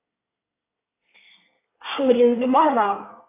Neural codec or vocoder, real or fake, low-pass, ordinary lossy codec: codec, 24 kHz, 1 kbps, SNAC; fake; 3.6 kHz; AAC, 24 kbps